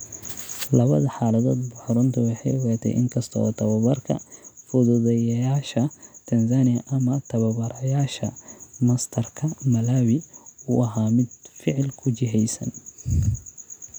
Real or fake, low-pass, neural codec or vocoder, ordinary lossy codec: real; none; none; none